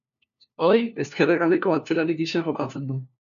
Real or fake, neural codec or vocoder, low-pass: fake; codec, 16 kHz, 1 kbps, FunCodec, trained on LibriTTS, 50 frames a second; 7.2 kHz